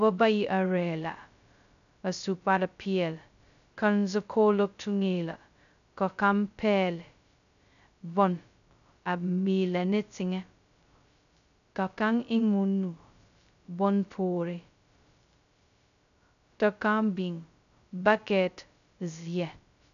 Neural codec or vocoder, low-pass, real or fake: codec, 16 kHz, 0.2 kbps, FocalCodec; 7.2 kHz; fake